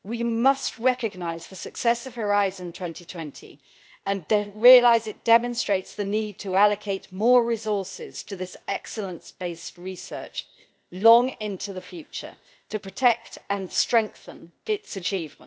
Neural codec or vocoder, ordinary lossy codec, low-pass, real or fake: codec, 16 kHz, 0.8 kbps, ZipCodec; none; none; fake